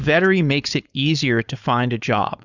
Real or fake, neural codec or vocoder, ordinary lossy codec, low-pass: fake; codec, 16 kHz, 4.8 kbps, FACodec; Opus, 64 kbps; 7.2 kHz